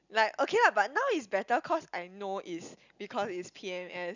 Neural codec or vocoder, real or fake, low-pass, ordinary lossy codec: none; real; 7.2 kHz; none